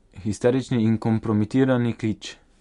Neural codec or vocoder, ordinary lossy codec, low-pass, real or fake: none; MP3, 64 kbps; 10.8 kHz; real